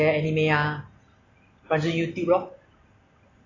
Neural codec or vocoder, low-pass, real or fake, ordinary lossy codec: none; 7.2 kHz; real; AAC, 32 kbps